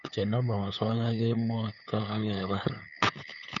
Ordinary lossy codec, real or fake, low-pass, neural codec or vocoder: none; fake; 7.2 kHz; codec, 16 kHz, 8 kbps, FunCodec, trained on LibriTTS, 25 frames a second